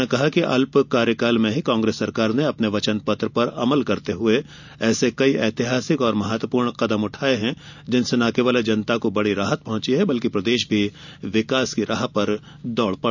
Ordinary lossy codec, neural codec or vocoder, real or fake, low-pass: none; none; real; 7.2 kHz